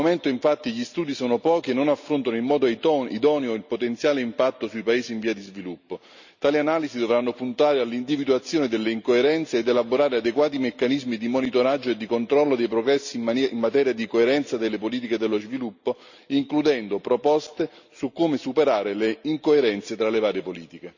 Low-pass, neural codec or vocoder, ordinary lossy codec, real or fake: 7.2 kHz; none; none; real